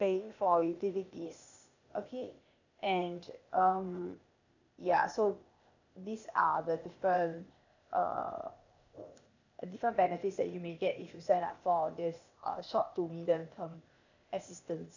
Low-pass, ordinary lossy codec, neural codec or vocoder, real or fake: 7.2 kHz; none; codec, 16 kHz, 0.8 kbps, ZipCodec; fake